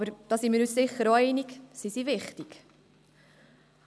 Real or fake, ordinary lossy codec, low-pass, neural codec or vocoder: real; none; none; none